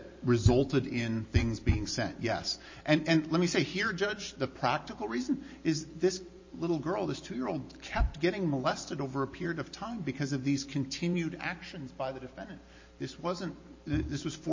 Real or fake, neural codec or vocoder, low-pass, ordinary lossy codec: fake; vocoder, 44.1 kHz, 128 mel bands every 512 samples, BigVGAN v2; 7.2 kHz; MP3, 32 kbps